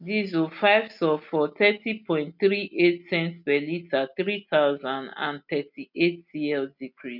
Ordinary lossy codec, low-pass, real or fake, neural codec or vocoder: none; 5.4 kHz; real; none